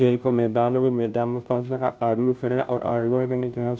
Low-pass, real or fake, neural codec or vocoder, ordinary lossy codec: none; fake; codec, 16 kHz, 0.5 kbps, FunCodec, trained on Chinese and English, 25 frames a second; none